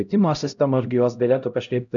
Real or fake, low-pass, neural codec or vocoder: fake; 7.2 kHz; codec, 16 kHz, 0.5 kbps, X-Codec, HuBERT features, trained on LibriSpeech